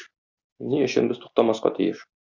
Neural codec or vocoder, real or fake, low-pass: none; real; 7.2 kHz